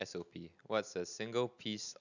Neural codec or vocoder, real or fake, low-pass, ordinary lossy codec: none; real; 7.2 kHz; none